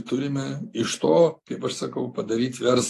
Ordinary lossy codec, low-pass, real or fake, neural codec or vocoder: AAC, 48 kbps; 14.4 kHz; real; none